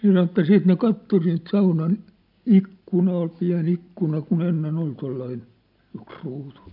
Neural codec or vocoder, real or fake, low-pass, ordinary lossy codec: none; real; 5.4 kHz; AAC, 48 kbps